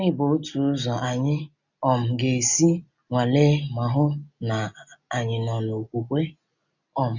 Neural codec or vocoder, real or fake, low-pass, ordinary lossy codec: none; real; 7.2 kHz; none